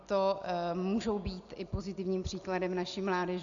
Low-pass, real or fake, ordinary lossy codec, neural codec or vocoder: 7.2 kHz; real; Opus, 64 kbps; none